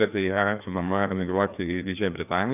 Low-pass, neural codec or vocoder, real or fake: 3.6 kHz; codec, 16 kHz, 2 kbps, FreqCodec, larger model; fake